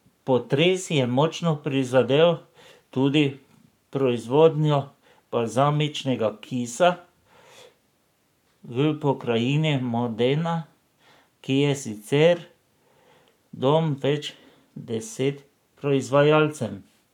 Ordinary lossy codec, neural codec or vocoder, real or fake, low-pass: none; codec, 44.1 kHz, 7.8 kbps, Pupu-Codec; fake; 19.8 kHz